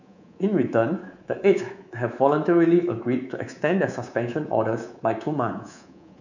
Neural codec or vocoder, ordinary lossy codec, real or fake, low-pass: codec, 24 kHz, 3.1 kbps, DualCodec; none; fake; 7.2 kHz